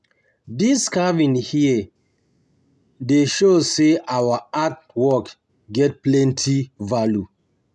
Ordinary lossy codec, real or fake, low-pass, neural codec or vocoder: none; real; none; none